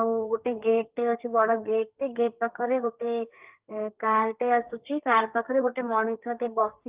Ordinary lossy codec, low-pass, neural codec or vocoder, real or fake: Opus, 32 kbps; 3.6 kHz; codec, 32 kHz, 1.9 kbps, SNAC; fake